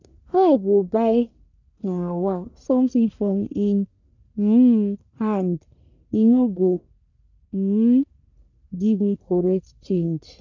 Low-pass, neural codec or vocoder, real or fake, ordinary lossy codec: 7.2 kHz; codec, 44.1 kHz, 1.7 kbps, Pupu-Codec; fake; none